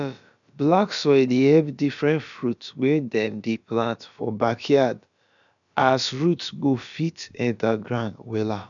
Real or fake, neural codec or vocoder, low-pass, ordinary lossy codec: fake; codec, 16 kHz, about 1 kbps, DyCAST, with the encoder's durations; 7.2 kHz; none